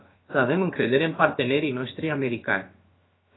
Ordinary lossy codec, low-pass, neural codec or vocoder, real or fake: AAC, 16 kbps; 7.2 kHz; codec, 16 kHz, about 1 kbps, DyCAST, with the encoder's durations; fake